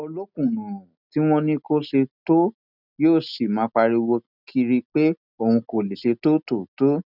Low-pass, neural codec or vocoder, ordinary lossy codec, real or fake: 5.4 kHz; none; none; real